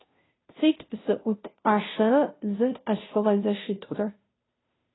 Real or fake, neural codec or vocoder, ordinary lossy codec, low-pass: fake; codec, 16 kHz, 0.5 kbps, FunCodec, trained on Chinese and English, 25 frames a second; AAC, 16 kbps; 7.2 kHz